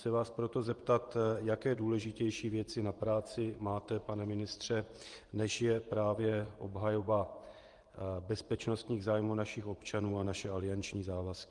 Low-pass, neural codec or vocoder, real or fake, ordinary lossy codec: 10.8 kHz; vocoder, 24 kHz, 100 mel bands, Vocos; fake; Opus, 24 kbps